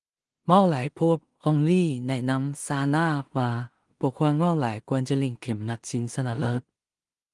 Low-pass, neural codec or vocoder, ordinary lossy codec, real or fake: 10.8 kHz; codec, 16 kHz in and 24 kHz out, 0.4 kbps, LongCat-Audio-Codec, two codebook decoder; Opus, 24 kbps; fake